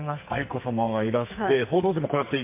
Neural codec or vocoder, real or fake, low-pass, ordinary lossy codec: codec, 44.1 kHz, 2.6 kbps, SNAC; fake; 3.6 kHz; MP3, 32 kbps